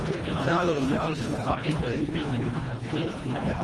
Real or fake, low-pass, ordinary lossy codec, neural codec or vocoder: fake; 10.8 kHz; Opus, 24 kbps; codec, 24 kHz, 1.5 kbps, HILCodec